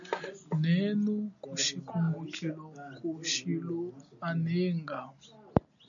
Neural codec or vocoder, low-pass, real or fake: none; 7.2 kHz; real